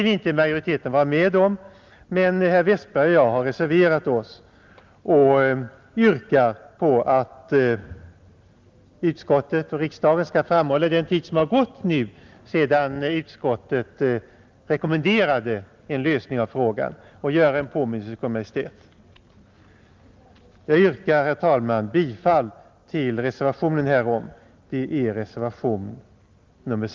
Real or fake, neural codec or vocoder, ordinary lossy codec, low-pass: real; none; Opus, 32 kbps; 7.2 kHz